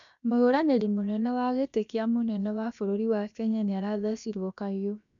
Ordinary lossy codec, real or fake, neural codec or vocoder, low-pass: none; fake; codec, 16 kHz, about 1 kbps, DyCAST, with the encoder's durations; 7.2 kHz